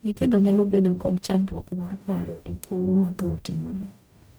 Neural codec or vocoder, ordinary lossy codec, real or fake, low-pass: codec, 44.1 kHz, 0.9 kbps, DAC; none; fake; none